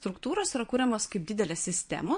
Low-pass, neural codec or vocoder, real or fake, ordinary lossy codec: 9.9 kHz; vocoder, 22.05 kHz, 80 mel bands, Vocos; fake; MP3, 48 kbps